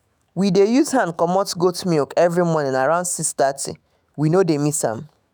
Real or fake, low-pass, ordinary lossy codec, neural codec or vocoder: fake; none; none; autoencoder, 48 kHz, 128 numbers a frame, DAC-VAE, trained on Japanese speech